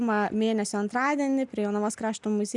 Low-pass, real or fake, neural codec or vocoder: 10.8 kHz; real; none